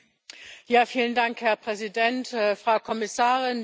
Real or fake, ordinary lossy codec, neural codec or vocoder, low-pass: real; none; none; none